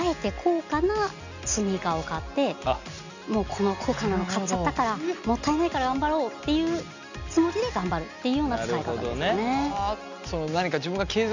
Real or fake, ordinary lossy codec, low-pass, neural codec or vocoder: real; none; 7.2 kHz; none